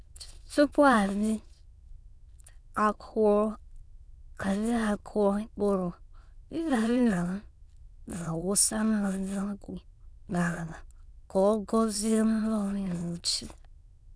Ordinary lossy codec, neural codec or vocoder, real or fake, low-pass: none; autoencoder, 22.05 kHz, a latent of 192 numbers a frame, VITS, trained on many speakers; fake; none